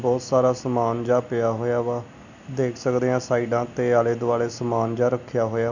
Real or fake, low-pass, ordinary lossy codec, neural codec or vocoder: real; 7.2 kHz; none; none